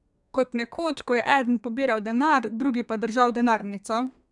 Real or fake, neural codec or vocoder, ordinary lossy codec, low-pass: fake; codec, 32 kHz, 1.9 kbps, SNAC; none; 10.8 kHz